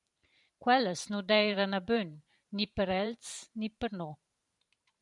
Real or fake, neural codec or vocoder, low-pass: fake; vocoder, 44.1 kHz, 128 mel bands every 512 samples, BigVGAN v2; 10.8 kHz